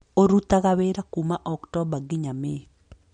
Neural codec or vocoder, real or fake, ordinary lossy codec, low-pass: none; real; MP3, 48 kbps; 9.9 kHz